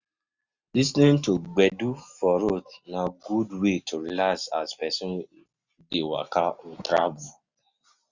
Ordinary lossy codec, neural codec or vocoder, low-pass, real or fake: Opus, 64 kbps; none; 7.2 kHz; real